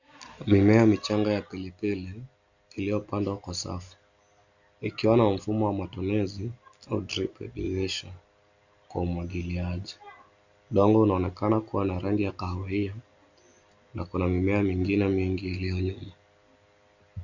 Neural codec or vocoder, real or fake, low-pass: none; real; 7.2 kHz